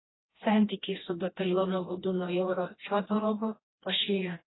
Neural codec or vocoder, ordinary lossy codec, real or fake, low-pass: codec, 16 kHz, 1 kbps, FreqCodec, smaller model; AAC, 16 kbps; fake; 7.2 kHz